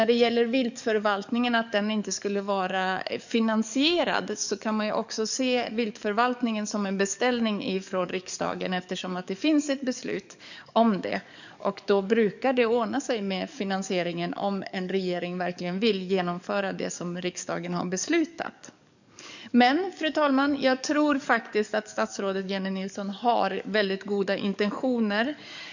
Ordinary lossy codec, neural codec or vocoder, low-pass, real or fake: none; codec, 44.1 kHz, 7.8 kbps, DAC; 7.2 kHz; fake